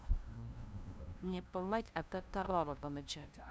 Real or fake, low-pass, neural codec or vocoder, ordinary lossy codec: fake; none; codec, 16 kHz, 0.5 kbps, FunCodec, trained on LibriTTS, 25 frames a second; none